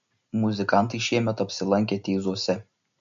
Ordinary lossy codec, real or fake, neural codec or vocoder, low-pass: MP3, 48 kbps; real; none; 7.2 kHz